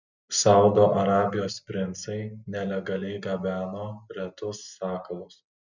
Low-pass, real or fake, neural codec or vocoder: 7.2 kHz; real; none